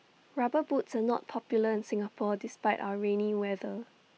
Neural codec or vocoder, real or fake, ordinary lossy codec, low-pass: none; real; none; none